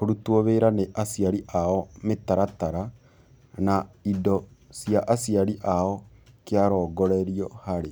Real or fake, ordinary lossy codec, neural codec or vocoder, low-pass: real; none; none; none